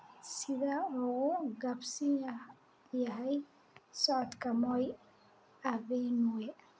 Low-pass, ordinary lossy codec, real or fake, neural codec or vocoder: none; none; real; none